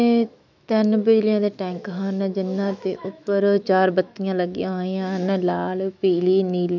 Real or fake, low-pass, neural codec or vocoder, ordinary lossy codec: fake; 7.2 kHz; autoencoder, 48 kHz, 128 numbers a frame, DAC-VAE, trained on Japanese speech; none